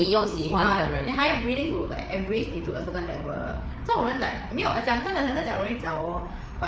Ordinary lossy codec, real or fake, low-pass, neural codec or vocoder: none; fake; none; codec, 16 kHz, 4 kbps, FreqCodec, larger model